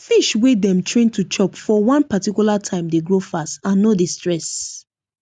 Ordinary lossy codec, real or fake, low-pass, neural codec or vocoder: none; real; 9.9 kHz; none